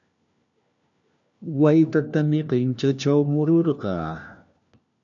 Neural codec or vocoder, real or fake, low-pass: codec, 16 kHz, 1 kbps, FunCodec, trained on LibriTTS, 50 frames a second; fake; 7.2 kHz